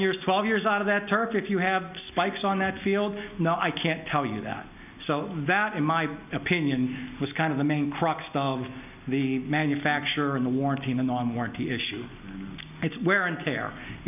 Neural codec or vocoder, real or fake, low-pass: none; real; 3.6 kHz